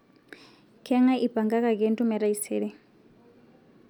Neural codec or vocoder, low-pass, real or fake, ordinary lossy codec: none; 19.8 kHz; real; none